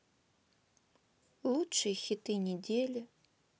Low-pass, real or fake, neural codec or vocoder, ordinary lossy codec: none; real; none; none